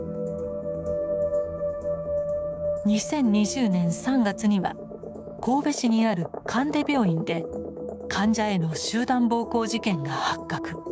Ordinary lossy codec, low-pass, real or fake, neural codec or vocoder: none; none; fake; codec, 16 kHz, 6 kbps, DAC